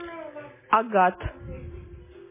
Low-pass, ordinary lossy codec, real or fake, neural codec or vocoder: 3.6 kHz; MP3, 16 kbps; real; none